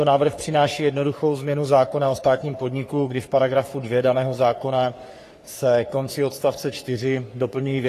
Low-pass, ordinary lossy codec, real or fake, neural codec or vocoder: 14.4 kHz; AAC, 48 kbps; fake; codec, 44.1 kHz, 3.4 kbps, Pupu-Codec